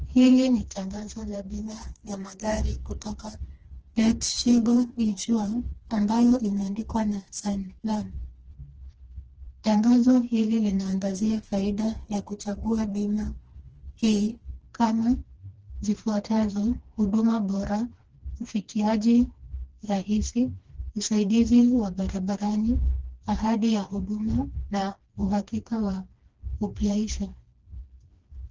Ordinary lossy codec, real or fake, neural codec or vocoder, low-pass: Opus, 16 kbps; fake; codec, 16 kHz, 2 kbps, FreqCodec, smaller model; 7.2 kHz